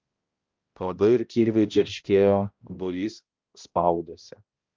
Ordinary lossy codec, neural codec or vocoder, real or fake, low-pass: Opus, 32 kbps; codec, 16 kHz, 0.5 kbps, X-Codec, HuBERT features, trained on balanced general audio; fake; 7.2 kHz